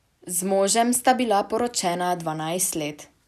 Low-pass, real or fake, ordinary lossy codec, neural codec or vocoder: 14.4 kHz; real; none; none